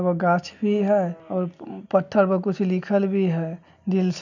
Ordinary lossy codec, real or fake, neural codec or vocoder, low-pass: none; real; none; 7.2 kHz